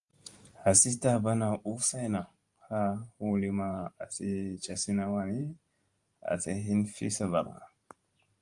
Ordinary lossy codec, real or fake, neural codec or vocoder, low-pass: Opus, 32 kbps; fake; vocoder, 44.1 kHz, 128 mel bands every 512 samples, BigVGAN v2; 10.8 kHz